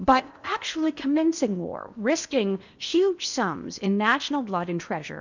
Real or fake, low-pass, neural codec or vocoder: fake; 7.2 kHz; codec, 16 kHz in and 24 kHz out, 0.8 kbps, FocalCodec, streaming, 65536 codes